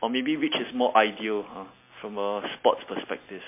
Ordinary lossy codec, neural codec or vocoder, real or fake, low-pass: MP3, 24 kbps; none; real; 3.6 kHz